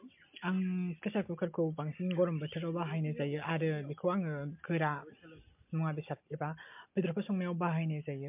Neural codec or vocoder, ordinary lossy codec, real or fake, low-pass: none; MP3, 32 kbps; real; 3.6 kHz